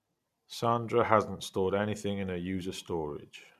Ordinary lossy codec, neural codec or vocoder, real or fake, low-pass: MP3, 96 kbps; none; real; 14.4 kHz